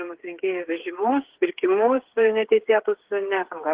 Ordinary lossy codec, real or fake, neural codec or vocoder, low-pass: Opus, 16 kbps; fake; codec, 16 kHz, 8 kbps, FreqCodec, smaller model; 3.6 kHz